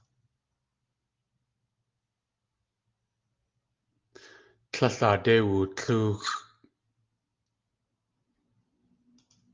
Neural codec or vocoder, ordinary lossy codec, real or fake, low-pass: none; Opus, 32 kbps; real; 7.2 kHz